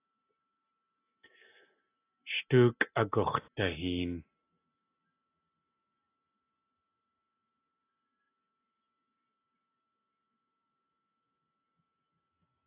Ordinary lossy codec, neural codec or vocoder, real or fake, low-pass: AAC, 24 kbps; none; real; 3.6 kHz